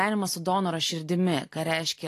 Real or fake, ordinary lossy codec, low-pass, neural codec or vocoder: real; AAC, 48 kbps; 14.4 kHz; none